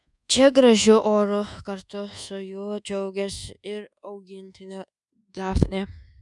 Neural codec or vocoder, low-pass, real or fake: codec, 24 kHz, 1.2 kbps, DualCodec; 10.8 kHz; fake